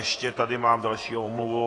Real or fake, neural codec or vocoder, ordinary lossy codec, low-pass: fake; vocoder, 48 kHz, 128 mel bands, Vocos; AAC, 32 kbps; 9.9 kHz